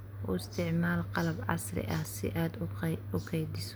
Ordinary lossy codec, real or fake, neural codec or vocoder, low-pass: none; real; none; none